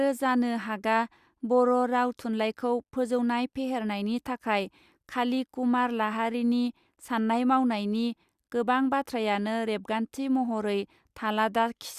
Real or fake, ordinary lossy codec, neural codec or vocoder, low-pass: real; Opus, 64 kbps; none; 14.4 kHz